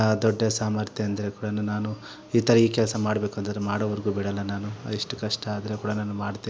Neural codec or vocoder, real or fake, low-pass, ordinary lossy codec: none; real; none; none